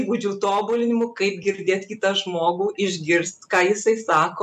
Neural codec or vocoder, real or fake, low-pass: none; real; 14.4 kHz